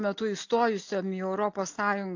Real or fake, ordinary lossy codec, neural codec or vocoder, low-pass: real; AAC, 48 kbps; none; 7.2 kHz